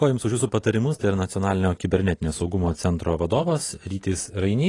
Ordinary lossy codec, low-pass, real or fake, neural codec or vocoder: AAC, 32 kbps; 10.8 kHz; real; none